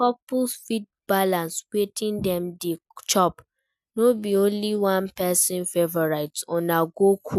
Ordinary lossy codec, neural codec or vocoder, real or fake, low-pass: none; none; real; 14.4 kHz